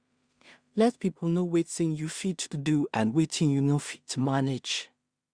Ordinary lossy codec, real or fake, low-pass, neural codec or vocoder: Opus, 64 kbps; fake; 9.9 kHz; codec, 16 kHz in and 24 kHz out, 0.4 kbps, LongCat-Audio-Codec, two codebook decoder